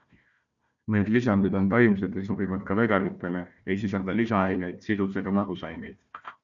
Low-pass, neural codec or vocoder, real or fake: 7.2 kHz; codec, 16 kHz, 1 kbps, FunCodec, trained on Chinese and English, 50 frames a second; fake